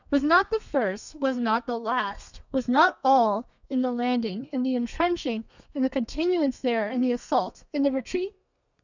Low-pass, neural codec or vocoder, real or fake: 7.2 kHz; codec, 32 kHz, 1.9 kbps, SNAC; fake